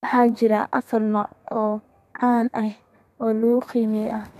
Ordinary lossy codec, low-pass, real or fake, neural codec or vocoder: none; 14.4 kHz; fake; codec, 32 kHz, 1.9 kbps, SNAC